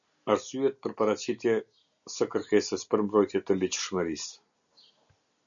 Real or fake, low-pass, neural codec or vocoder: real; 7.2 kHz; none